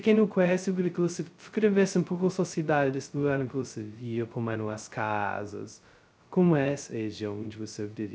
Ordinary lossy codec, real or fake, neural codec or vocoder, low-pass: none; fake; codec, 16 kHz, 0.2 kbps, FocalCodec; none